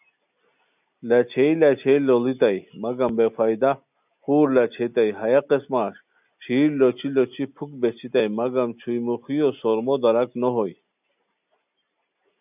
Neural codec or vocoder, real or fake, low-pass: none; real; 3.6 kHz